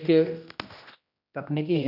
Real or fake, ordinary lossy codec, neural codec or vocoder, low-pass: fake; none; codec, 16 kHz, 1 kbps, X-Codec, HuBERT features, trained on general audio; 5.4 kHz